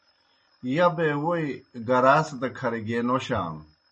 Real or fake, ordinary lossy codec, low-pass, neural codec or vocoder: real; MP3, 32 kbps; 10.8 kHz; none